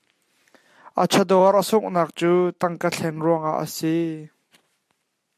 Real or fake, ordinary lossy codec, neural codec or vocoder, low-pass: real; AAC, 96 kbps; none; 14.4 kHz